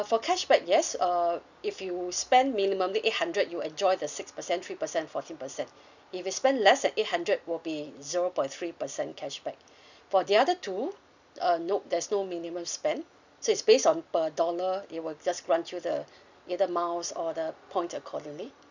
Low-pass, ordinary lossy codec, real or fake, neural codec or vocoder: 7.2 kHz; none; real; none